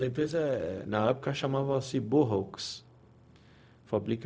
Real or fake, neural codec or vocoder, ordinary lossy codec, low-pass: fake; codec, 16 kHz, 0.4 kbps, LongCat-Audio-Codec; none; none